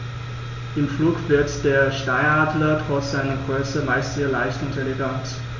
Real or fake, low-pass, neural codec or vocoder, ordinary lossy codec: real; 7.2 kHz; none; none